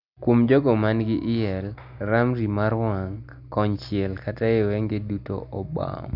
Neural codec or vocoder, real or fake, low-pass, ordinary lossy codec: none; real; 5.4 kHz; none